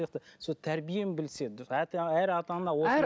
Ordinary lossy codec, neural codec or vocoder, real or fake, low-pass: none; none; real; none